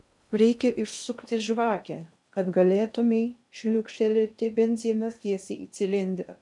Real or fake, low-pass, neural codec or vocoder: fake; 10.8 kHz; codec, 16 kHz in and 24 kHz out, 0.6 kbps, FocalCodec, streaming, 2048 codes